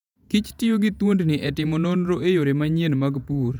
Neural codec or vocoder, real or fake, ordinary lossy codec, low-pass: vocoder, 48 kHz, 128 mel bands, Vocos; fake; none; 19.8 kHz